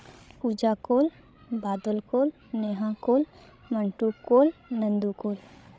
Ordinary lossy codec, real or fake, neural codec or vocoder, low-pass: none; fake; codec, 16 kHz, 16 kbps, FreqCodec, larger model; none